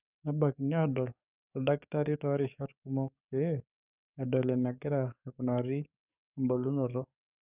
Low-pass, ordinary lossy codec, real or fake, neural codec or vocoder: 3.6 kHz; none; fake; codec, 44.1 kHz, 7.8 kbps, DAC